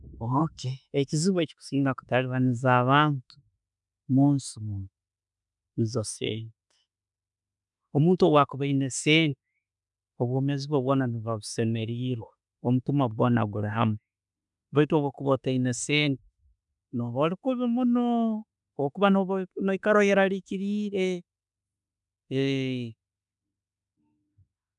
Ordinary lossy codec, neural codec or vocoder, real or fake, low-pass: none; autoencoder, 48 kHz, 32 numbers a frame, DAC-VAE, trained on Japanese speech; fake; 10.8 kHz